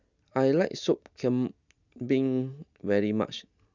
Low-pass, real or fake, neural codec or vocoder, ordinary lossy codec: 7.2 kHz; real; none; none